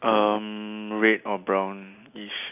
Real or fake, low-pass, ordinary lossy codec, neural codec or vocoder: real; 3.6 kHz; none; none